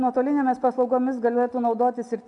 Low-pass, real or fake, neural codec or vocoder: 10.8 kHz; real; none